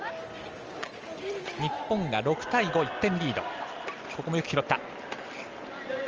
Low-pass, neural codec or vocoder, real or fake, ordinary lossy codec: 7.2 kHz; none; real; Opus, 24 kbps